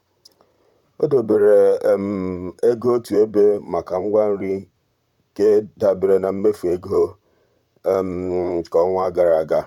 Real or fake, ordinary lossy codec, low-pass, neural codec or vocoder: fake; none; 19.8 kHz; vocoder, 44.1 kHz, 128 mel bands, Pupu-Vocoder